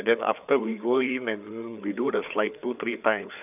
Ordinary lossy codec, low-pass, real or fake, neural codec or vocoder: none; 3.6 kHz; fake; codec, 16 kHz, 4 kbps, FreqCodec, larger model